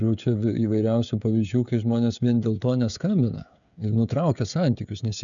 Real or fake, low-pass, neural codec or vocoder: fake; 7.2 kHz; codec, 16 kHz, 16 kbps, FreqCodec, smaller model